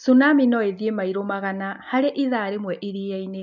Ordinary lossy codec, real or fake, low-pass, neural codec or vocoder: MP3, 64 kbps; real; 7.2 kHz; none